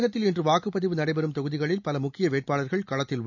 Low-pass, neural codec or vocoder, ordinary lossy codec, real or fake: 7.2 kHz; none; none; real